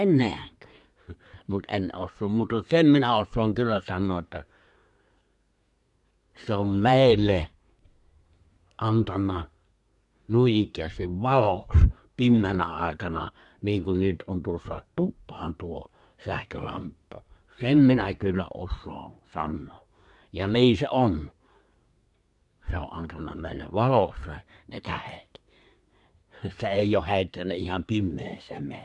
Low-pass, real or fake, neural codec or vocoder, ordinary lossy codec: 10.8 kHz; fake; codec, 24 kHz, 1 kbps, SNAC; none